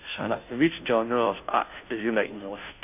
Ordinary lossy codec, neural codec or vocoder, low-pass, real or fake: none; codec, 16 kHz, 0.5 kbps, FunCodec, trained on Chinese and English, 25 frames a second; 3.6 kHz; fake